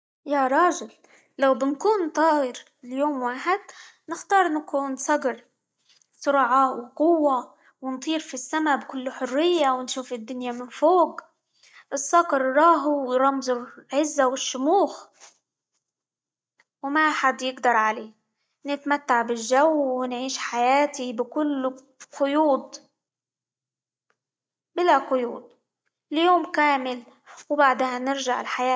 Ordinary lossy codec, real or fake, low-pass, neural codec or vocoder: none; real; none; none